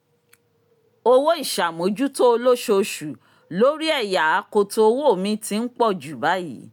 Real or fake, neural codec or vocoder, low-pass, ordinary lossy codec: real; none; 19.8 kHz; none